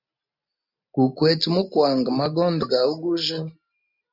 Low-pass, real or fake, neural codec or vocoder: 5.4 kHz; real; none